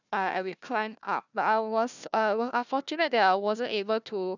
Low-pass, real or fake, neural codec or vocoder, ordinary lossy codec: 7.2 kHz; fake; codec, 16 kHz, 1 kbps, FunCodec, trained on Chinese and English, 50 frames a second; none